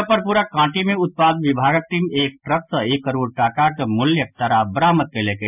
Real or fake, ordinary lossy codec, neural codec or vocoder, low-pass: real; none; none; 3.6 kHz